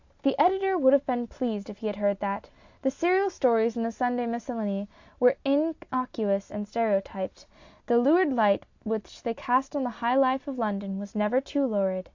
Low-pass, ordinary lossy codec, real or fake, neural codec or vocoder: 7.2 kHz; AAC, 48 kbps; real; none